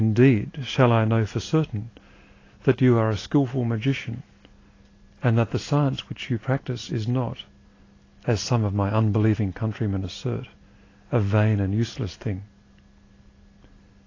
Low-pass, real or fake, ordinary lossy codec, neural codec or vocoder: 7.2 kHz; real; AAC, 32 kbps; none